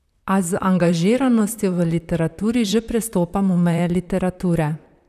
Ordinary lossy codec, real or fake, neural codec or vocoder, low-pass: none; fake; vocoder, 44.1 kHz, 128 mel bands, Pupu-Vocoder; 14.4 kHz